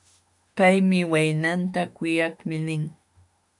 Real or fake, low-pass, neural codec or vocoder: fake; 10.8 kHz; autoencoder, 48 kHz, 32 numbers a frame, DAC-VAE, trained on Japanese speech